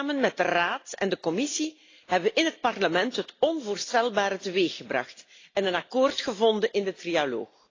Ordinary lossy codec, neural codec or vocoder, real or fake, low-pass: AAC, 32 kbps; none; real; 7.2 kHz